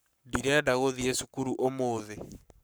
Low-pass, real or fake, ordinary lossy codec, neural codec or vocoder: none; fake; none; codec, 44.1 kHz, 7.8 kbps, Pupu-Codec